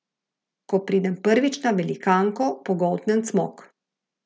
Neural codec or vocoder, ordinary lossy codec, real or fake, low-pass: none; none; real; none